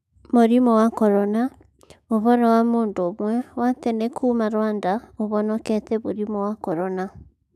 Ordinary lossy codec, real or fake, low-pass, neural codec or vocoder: none; fake; 14.4 kHz; autoencoder, 48 kHz, 128 numbers a frame, DAC-VAE, trained on Japanese speech